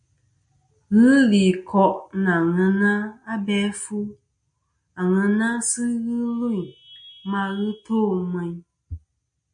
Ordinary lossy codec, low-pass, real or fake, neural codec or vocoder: MP3, 48 kbps; 9.9 kHz; real; none